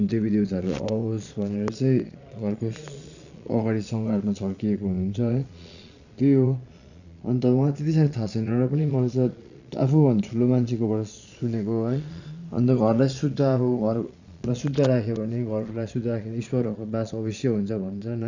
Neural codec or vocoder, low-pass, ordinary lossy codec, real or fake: vocoder, 22.05 kHz, 80 mel bands, Vocos; 7.2 kHz; none; fake